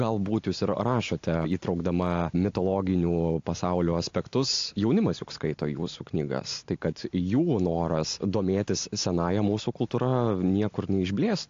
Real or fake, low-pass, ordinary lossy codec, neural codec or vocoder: real; 7.2 kHz; AAC, 48 kbps; none